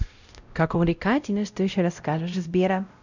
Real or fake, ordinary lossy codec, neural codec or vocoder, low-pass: fake; none; codec, 16 kHz, 0.5 kbps, X-Codec, WavLM features, trained on Multilingual LibriSpeech; 7.2 kHz